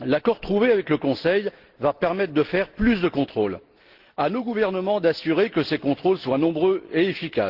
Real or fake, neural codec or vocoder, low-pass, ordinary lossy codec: real; none; 5.4 kHz; Opus, 16 kbps